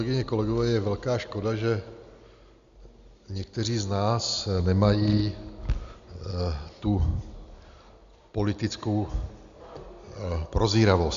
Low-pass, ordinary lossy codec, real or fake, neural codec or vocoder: 7.2 kHz; Opus, 64 kbps; real; none